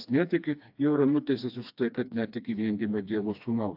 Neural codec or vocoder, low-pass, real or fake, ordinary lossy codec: codec, 16 kHz, 2 kbps, FreqCodec, smaller model; 5.4 kHz; fake; AAC, 48 kbps